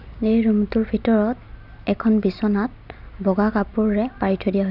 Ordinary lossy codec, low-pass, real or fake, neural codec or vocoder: none; 5.4 kHz; real; none